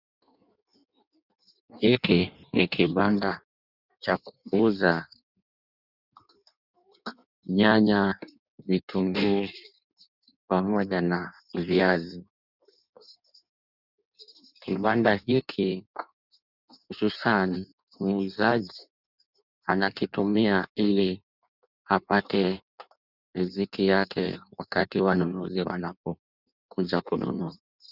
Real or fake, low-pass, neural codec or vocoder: fake; 5.4 kHz; codec, 16 kHz in and 24 kHz out, 1.1 kbps, FireRedTTS-2 codec